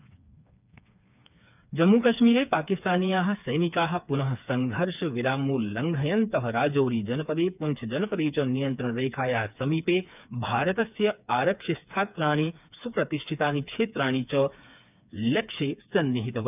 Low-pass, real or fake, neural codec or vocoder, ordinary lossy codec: 3.6 kHz; fake; codec, 16 kHz, 4 kbps, FreqCodec, smaller model; none